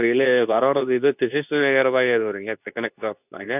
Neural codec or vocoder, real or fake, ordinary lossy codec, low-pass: codec, 24 kHz, 0.9 kbps, WavTokenizer, medium speech release version 2; fake; none; 3.6 kHz